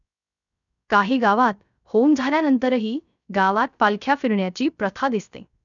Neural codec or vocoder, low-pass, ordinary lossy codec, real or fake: codec, 16 kHz, 0.3 kbps, FocalCodec; 7.2 kHz; none; fake